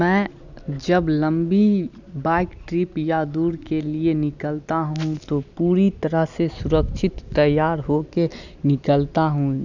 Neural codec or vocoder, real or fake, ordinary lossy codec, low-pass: none; real; none; 7.2 kHz